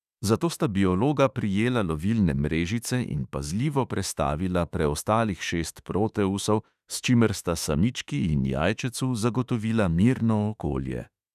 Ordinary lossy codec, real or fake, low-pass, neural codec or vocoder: none; fake; 14.4 kHz; autoencoder, 48 kHz, 32 numbers a frame, DAC-VAE, trained on Japanese speech